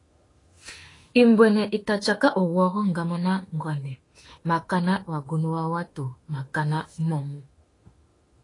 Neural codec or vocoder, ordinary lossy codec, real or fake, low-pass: autoencoder, 48 kHz, 32 numbers a frame, DAC-VAE, trained on Japanese speech; AAC, 32 kbps; fake; 10.8 kHz